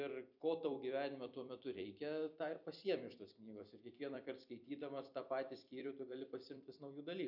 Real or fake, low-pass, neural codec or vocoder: fake; 5.4 kHz; vocoder, 44.1 kHz, 128 mel bands every 256 samples, BigVGAN v2